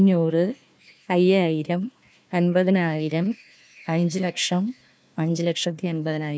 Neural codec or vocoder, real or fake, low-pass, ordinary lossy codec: codec, 16 kHz, 1 kbps, FunCodec, trained on Chinese and English, 50 frames a second; fake; none; none